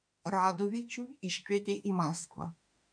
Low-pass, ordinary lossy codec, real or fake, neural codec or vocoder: 9.9 kHz; MP3, 64 kbps; fake; autoencoder, 48 kHz, 32 numbers a frame, DAC-VAE, trained on Japanese speech